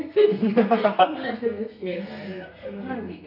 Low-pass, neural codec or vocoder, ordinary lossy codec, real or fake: 5.4 kHz; codec, 32 kHz, 1.9 kbps, SNAC; none; fake